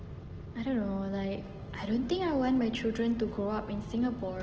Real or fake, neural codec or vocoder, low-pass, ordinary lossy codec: real; none; 7.2 kHz; Opus, 16 kbps